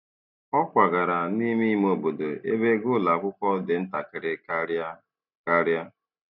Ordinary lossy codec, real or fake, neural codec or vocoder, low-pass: none; real; none; 5.4 kHz